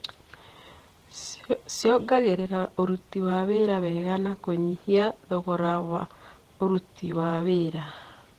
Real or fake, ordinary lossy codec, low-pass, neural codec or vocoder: fake; Opus, 16 kbps; 14.4 kHz; vocoder, 48 kHz, 128 mel bands, Vocos